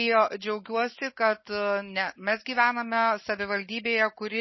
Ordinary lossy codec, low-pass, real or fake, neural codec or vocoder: MP3, 24 kbps; 7.2 kHz; real; none